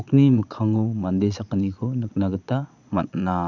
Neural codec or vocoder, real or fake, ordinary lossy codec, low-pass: vocoder, 22.05 kHz, 80 mel bands, Vocos; fake; none; 7.2 kHz